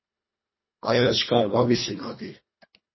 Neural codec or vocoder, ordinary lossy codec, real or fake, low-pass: codec, 24 kHz, 1.5 kbps, HILCodec; MP3, 24 kbps; fake; 7.2 kHz